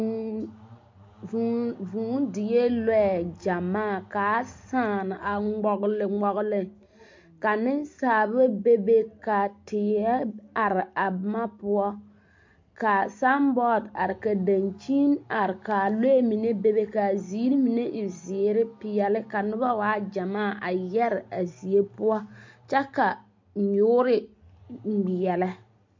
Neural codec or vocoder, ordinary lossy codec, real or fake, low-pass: none; MP3, 48 kbps; real; 7.2 kHz